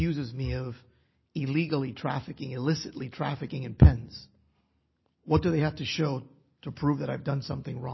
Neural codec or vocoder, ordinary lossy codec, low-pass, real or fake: none; MP3, 24 kbps; 7.2 kHz; real